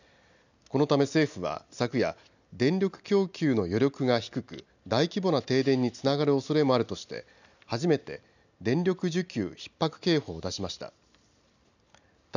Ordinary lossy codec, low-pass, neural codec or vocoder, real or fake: none; 7.2 kHz; none; real